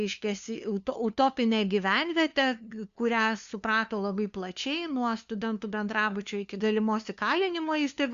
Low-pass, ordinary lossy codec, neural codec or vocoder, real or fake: 7.2 kHz; Opus, 64 kbps; codec, 16 kHz, 2 kbps, FunCodec, trained on LibriTTS, 25 frames a second; fake